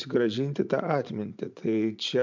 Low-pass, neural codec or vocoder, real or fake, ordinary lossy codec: 7.2 kHz; vocoder, 44.1 kHz, 80 mel bands, Vocos; fake; MP3, 64 kbps